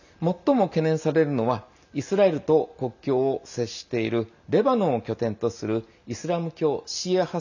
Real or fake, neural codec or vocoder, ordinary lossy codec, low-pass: real; none; none; 7.2 kHz